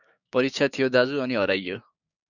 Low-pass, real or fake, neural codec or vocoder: 7.2 kHz; fake; codec, 16 kHz, 6 kbps, DAC